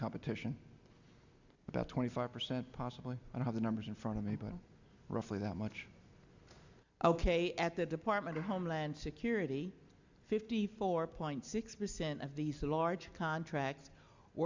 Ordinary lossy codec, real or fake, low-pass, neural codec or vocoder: Opus, 64 kbps; real; 7.2 kHz; none